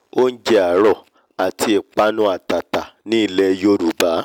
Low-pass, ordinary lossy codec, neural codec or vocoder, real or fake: 19.8 kHz; none; none; real